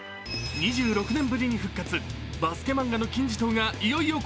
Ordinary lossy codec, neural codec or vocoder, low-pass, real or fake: none; none; none; real